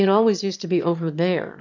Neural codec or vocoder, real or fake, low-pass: autoencoder, 22.05 kHz, a latent of 192 numbers a frame, VITS, trained on one speaker; fake; 7.2 kHz